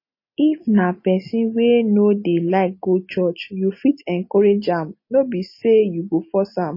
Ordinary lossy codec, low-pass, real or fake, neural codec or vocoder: MP3, 24 kbps; 5.4 kHz; real; none